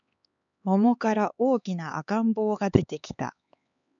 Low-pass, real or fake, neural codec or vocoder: 7.2 kHz; fake; codec, 16 kHz, 4 kbps, X-Codec, HuBERT features, trained on LibriSpeech